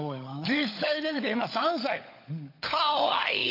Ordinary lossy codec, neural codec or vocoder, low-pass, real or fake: AAC, 32 kbps; codec, 16 kHz, 16 kbps, FunCodec, trained on LibriTTS, 50 frames a second; 5.4 kHz; fake